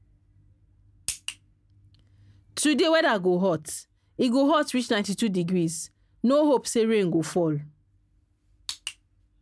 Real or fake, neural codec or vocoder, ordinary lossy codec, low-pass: real; none; none; none